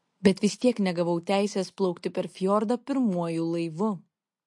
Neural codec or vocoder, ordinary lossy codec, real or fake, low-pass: none; MP3, 48 kbps; real; 10.8 kHz